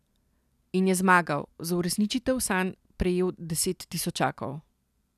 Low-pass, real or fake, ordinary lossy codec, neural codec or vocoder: 14.4 kHz; real; none; none